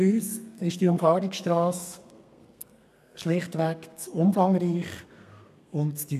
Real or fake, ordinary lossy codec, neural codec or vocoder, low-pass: fake; none; codec, 32 kHz, 1.9 kbps, SNAC; 14.4 kHz